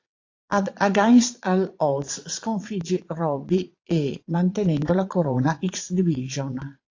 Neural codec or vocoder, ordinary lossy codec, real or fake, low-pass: vocoder, 22.05 kHz, 80 mel bands, Vocos; AAC, 48 kbps; fake; 7.2 kHz